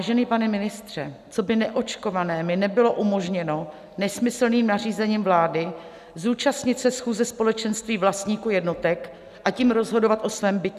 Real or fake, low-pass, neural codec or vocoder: real; 14.4 kHz; none